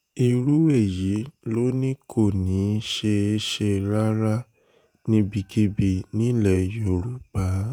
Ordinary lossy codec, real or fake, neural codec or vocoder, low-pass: none; fake; vocoder, 48 kHz, 128 mel bands, Vocos; none